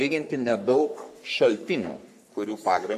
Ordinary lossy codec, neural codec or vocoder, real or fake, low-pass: AAC, 96 kbps; codec, 44.1 kHz, 3.4 kbps, Pupu-Codec; fake; 14.4 kHz